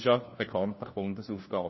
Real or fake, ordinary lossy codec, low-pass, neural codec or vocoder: fake; MP3, 24 kbps; 7.2 kHz; codec, 16 kHz, 1 kbps, FunCodec, trained on LibriTTS, 50 frames a second